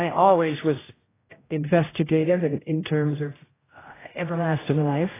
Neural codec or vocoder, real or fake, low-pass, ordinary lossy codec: codec, 16 kHz, 0.5 kbps, X-Codec, HuBERT features, trained on general audio; fake; 3.6 kHz; AAC, 16 kbps